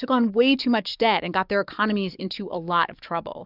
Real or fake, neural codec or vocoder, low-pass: fake; codec, 44.1 kHz, 7.8 kbps, DAC; 5.4 kHz